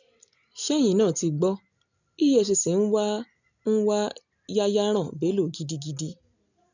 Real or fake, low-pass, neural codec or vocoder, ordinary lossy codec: real; 7.2 kHz; none; none